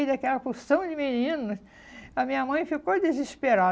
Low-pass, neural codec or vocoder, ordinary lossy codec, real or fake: none; none; none; real